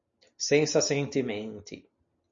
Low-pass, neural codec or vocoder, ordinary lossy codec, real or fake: 7.2 kHz; none; MP3, 64 kbps; real